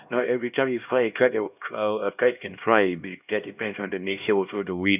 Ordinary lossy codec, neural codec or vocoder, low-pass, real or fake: none; codec, 16 kHz, 1 kbps, X-Codec, HuBERT features, trained on LibriSpeech; 3.6 kHz; fake